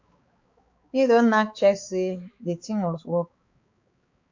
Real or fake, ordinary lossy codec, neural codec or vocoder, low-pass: fake; MP3, 48 kbps; codec, 16 kHz, 4 kbps, X-Codec, HuBERT features, trained on balanced general audio; 7.2 kHz